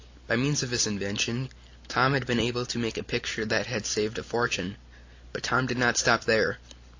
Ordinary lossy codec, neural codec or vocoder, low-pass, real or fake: AAC, 48 kbps; none; 7.2 kHz; real